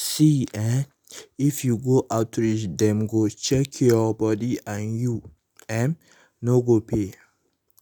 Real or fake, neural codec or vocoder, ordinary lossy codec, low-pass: real; none; none; none